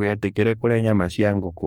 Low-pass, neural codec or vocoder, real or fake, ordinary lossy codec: 19.8 kHz; codec, 44.1 kHz, 2.6 kbps, DAC; fake; MP3, 96 kbps